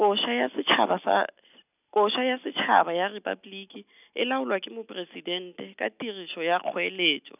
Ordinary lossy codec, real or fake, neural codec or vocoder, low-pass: none; real; none; 3.6 kHz